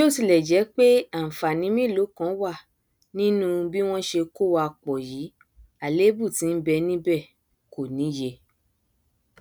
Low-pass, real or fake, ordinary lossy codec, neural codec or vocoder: none; real; none; none